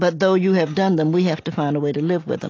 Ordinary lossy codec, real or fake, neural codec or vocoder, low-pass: AAC, 32 kbps; real; none; 7.2 kHz